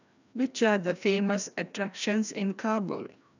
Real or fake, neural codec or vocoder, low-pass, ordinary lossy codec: fake; codec, 16 kHz, 1 kbps, FreqCodec, larger model; 7.2 kHz; none